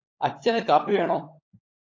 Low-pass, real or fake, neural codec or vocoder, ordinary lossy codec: 7.2 kHz; fake; codec, 16 kHz, 16 kbps, FunCodec, trained on LibriTTS, 50 frames a second; AAC, 32 kbps